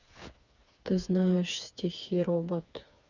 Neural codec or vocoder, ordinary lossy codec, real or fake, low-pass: codec, 16 kHz, 4 kbps, FreqCodec, smaller model; Opus, 64 kbps; fake; 7.2 kHz